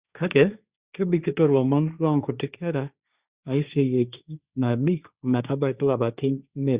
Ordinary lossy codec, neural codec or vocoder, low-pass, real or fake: Opus, 64 kbps; codec, 16 kHz, 1.1 kbps, Voila-Tokenizer; 3.6 kHz; fake